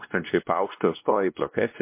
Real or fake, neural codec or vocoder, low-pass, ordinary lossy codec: fake; codec, 16 kHz, 1 kbps, X-Codec, WavLM features, trained on Multilingual LibriSpeech; 3.6 kHz; MP3, 24 kbps